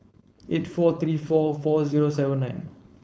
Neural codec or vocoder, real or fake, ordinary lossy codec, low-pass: codec, 16 kHz, 4.8 kbps, FACodec; fake; none; none